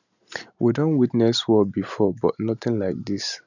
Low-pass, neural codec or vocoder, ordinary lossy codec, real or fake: 7.2 kHz; none; none; real